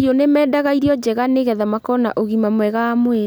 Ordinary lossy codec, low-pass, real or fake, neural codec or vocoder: none; none; real; none